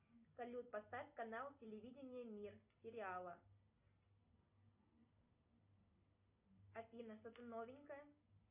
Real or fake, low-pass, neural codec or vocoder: real; 3.6 kHz; none